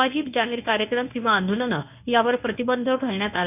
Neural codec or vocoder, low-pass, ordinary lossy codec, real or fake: codec, 24 kHz, 0.9 kbps, WavTokenizer, medium speech release version 1; 3.6 kHz; none; fake